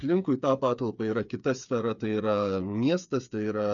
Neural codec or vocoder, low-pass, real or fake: codec, 16 kHz, 8 kbps, FreqCodec, smaller model; 7.2 kHz; fake